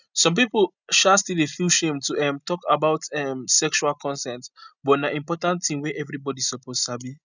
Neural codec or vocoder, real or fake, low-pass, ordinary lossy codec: none; real; 7.2 kHz; none